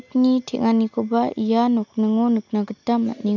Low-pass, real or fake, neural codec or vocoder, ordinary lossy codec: 7.2 kHz; real; none; none